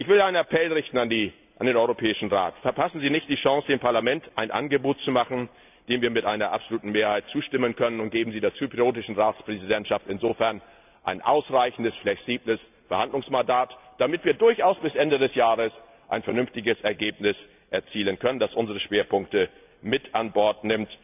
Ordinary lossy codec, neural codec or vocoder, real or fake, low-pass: none; none; real; 3.6 kHz